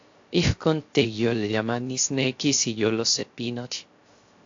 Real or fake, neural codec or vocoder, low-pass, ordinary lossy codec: fake; codec, 16 kHz, 0.3 kbps, FocalCodec; 7.2 kHz; AAC, 48 kbps